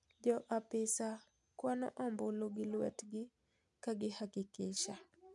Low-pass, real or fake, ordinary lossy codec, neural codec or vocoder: 10.8 kHz; real; none; none